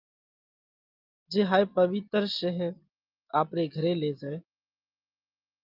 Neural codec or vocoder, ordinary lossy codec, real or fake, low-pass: none; Opus, 32 kbps; real; 5.4 kHz